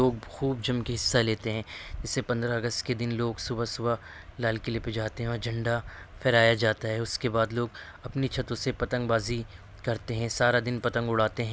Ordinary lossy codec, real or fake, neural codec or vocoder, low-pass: none; real; none; none